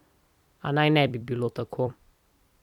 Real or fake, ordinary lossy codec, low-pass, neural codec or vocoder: fake; none; 19.8 kHz; vocoder, 44.1 kHz, 128 mel bands every 256 samples, BigVGAN v2